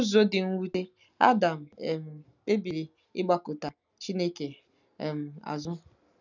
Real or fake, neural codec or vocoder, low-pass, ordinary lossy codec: real; none; 7.2 kHz; none